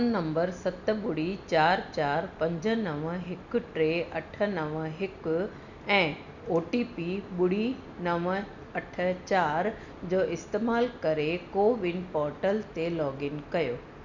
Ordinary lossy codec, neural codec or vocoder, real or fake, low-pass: none; none; real; 7.2 kHz